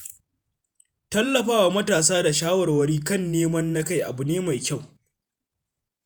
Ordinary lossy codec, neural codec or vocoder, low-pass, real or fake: none; vocoder, 48 kHz, 128 mel bands, Vocos; none; fake